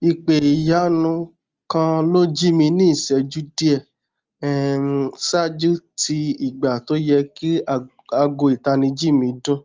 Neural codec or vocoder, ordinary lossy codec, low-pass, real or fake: none; Opus, 24 kbps; 7.2 kHz; real